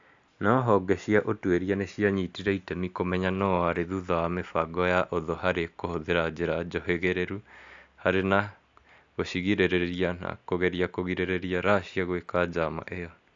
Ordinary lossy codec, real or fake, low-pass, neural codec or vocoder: AAC, 64 kbps; real; 7.2 kHz; none